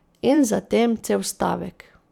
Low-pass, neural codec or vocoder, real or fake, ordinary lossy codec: 19.8 kHz; vocoder, 44.1 kHz, 128 mel bands every 512 samples, BigVGAN v2; fake; none